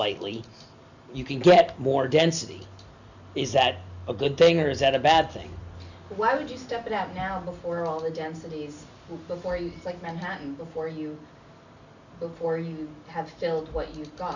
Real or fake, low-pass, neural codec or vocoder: real; 7.2 kHz; none